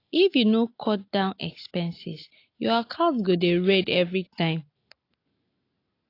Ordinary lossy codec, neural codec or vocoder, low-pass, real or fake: AAC, 32 kbps; none; 5.4 kHz; real